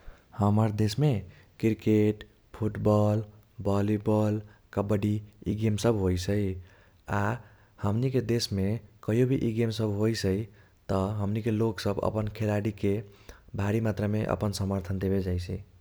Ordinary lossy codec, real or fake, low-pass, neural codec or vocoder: none; real; none; none